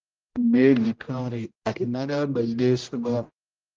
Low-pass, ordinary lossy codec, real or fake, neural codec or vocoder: 7.2 kHz; Opus, 32 kbps; fake; codec, 16 kHz, 0.5 kbps, X-Codec, HuBERT features, trained on general audio